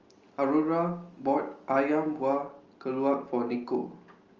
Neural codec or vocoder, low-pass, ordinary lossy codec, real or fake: none; 7.2 kHz; Opus, 32 kbps; real